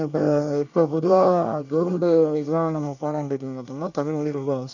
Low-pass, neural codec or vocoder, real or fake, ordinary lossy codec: 7.2 kHz; codec, 24 kHz, 1 kbps, SNAC; fake; none